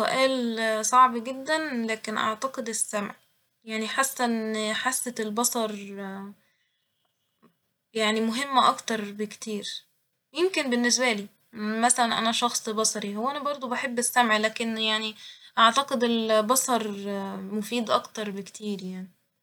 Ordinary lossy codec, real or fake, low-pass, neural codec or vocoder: none; real; none; none